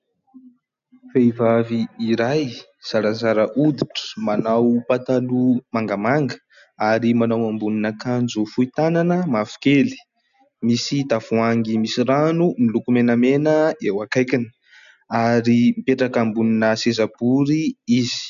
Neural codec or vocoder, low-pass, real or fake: none; 7.2 kHz; real